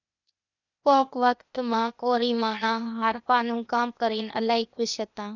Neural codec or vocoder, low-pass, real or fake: codec, 16 kHz, 0.8 kbps, ZipCodec; 7.2 kHz; fake